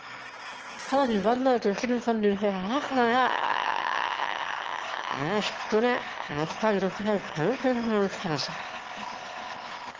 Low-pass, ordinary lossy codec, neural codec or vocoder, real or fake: 7.2 kHz; Opus, 16 kbps; autoencoder, 22.05 kHz, a latent of 192 numbers a frame, VITS, trained on one speaker; fake